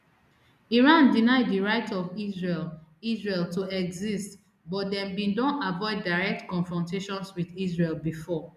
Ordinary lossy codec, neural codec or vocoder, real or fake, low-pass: none; none; real; 14.4 kHz